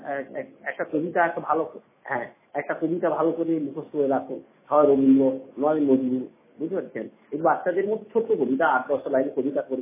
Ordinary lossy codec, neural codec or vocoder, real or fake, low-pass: MP3, 16 kbps; autoencoder, 48 kHz, 128 numbers a frame, DAC-VAE, trained on Japanese speech; fake; 3.6 kHz